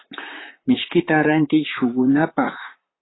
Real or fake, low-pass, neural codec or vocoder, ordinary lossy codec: fake; 7.2 kHz; vocoder, 44.1 kHz, 128 mel bands, Pupu-Vocoder; AAC, 16 kbps